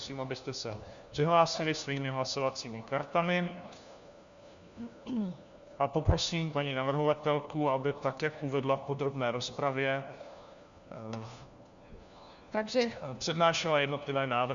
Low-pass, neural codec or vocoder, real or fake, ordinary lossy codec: 7.2 kHz; codec, 16 kHz, 1 kbps, FunCodec, trained on LibriTTS, 50 frames a second; fake; Opus, 64 kbps